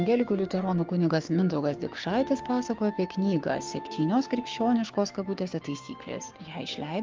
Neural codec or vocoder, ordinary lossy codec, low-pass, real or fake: codec, 16 kHz, 6 kbps, DAC; Opus, 32 kbps; 7.2 kHz; fake